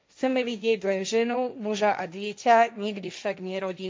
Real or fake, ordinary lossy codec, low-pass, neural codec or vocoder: fake; none; none; codec, 16 kHz, 1.1 kbps, Voila-Tokenizer